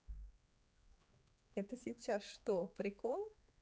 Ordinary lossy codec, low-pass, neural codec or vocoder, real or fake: none; none; codec, 16 kHz, 4 kbps, X-Codec, HuBERT features, trained on general audio; fake